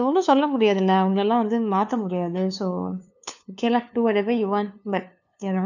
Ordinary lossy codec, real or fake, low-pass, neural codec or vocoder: none; fake; 7.2 kHz; codec, 16 kHz, 2 kbps, FunCodec, trained on LibriTTS, 25 frames a second